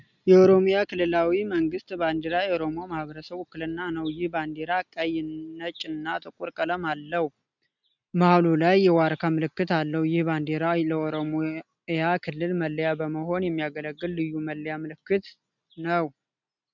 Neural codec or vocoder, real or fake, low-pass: none; real; 7.2 kHz